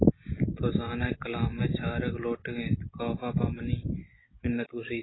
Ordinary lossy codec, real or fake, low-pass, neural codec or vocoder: AAC, 16 kbps; real; 7.2 kHz; none